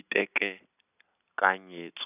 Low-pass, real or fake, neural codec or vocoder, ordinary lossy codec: 3.6 kHz; real; none; none